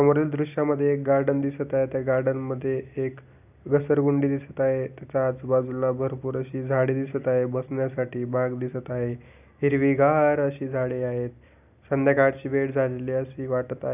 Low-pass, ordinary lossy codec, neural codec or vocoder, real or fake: 3.6 kHz; none; none; real